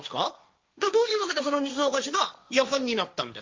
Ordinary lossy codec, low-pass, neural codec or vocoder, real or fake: Opus, 32 kbps; 7.2 kHz; codec, 16 kHz, 1.1 kbps, Voila-Tokenizer; fake